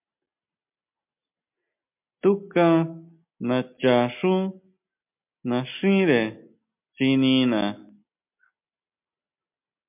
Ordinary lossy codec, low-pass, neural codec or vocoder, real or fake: MP3, 32 kbps; 3.6 kHz; none; real